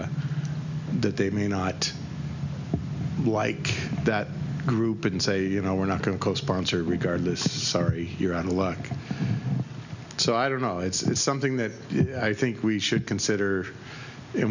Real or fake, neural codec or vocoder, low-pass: real; none; 7.2 kHz